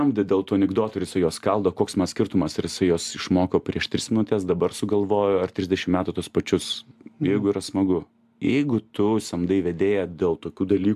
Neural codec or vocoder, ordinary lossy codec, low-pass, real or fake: none; AAC, 96 kbps; 14.4 kHz; real